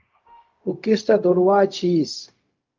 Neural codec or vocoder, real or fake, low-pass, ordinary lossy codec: codec, 16 kHz, 0.4 kbps, LongCat-Audio-Codec; fake; 7.2 kHz; Opus, 32 kbps